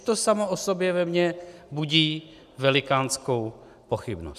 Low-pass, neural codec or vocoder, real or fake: 14.4 kHz; none; real